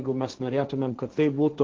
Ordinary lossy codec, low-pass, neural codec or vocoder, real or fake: Opus, 16 kbps; 7.2 kHz; codec, 16 kHz, 1.1 kbps, Voila-Tokenizer; fake